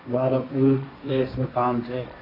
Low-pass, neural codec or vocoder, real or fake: 5.4 kHz; codec, 16 kHz, 1.1 kbps, Voila-Tokenizer; fake